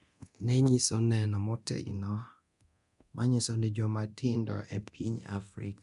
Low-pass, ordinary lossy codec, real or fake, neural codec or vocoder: 10.8 kHz; AAC, 96 kbps; fake; codec, 24 kHz, 0.9 kbps, DualCodec